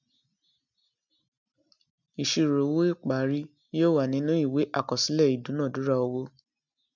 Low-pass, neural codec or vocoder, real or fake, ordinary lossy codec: 7.2 kHz; none; real; none